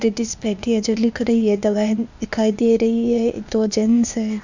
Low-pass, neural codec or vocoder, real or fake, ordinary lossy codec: 7.2 kHz; codec, 16 kHz, 0.8 kbps, ZipCodec; fake; none